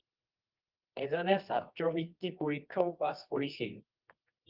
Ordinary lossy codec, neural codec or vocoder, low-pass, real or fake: Opus, 32 kbps; codec, 24 kHz, 0.9 kbps, WavTokenizer, medium music audio release; 5.4 kHz; fake